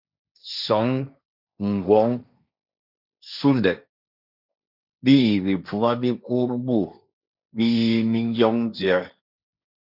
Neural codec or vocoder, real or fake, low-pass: codec, 16 kHz, 1.1 kbps, Voila-Tokenizer; fake; 5.4 kHz